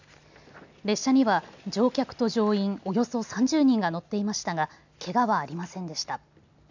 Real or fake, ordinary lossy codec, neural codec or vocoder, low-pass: real; none; none; 7.2 kHz